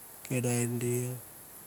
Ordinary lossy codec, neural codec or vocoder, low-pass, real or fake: none; none; none; real